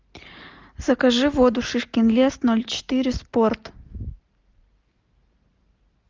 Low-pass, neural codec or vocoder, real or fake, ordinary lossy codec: 7.2 kHz; none; real; Opus, 32 kbps